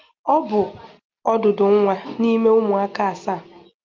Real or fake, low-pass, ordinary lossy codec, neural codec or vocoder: real; 7.2 kHz; Opus, 24 kbps; none